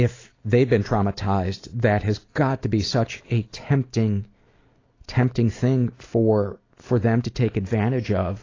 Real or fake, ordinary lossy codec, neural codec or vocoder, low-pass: real; AAC, 32 kbps; none; 7.2 kHz